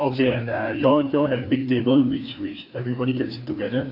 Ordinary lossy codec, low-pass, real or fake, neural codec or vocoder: AAC, 48 kbps; 5.4 kHz; fake; codec, 16 kHz, 2 kbps, FreqCodec, larger model